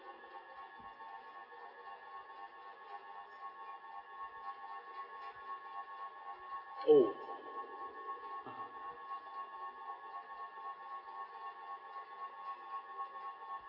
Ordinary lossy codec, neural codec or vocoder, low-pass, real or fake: none; none; 5.4 kHz; real